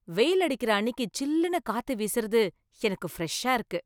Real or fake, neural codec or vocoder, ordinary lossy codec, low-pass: real; none; none; none